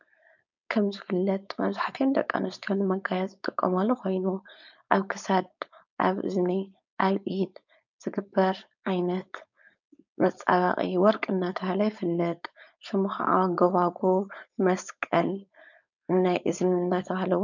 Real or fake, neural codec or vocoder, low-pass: fake; codec, 16 kHz, 4.8 kbps, FACodec; 7.2 kHz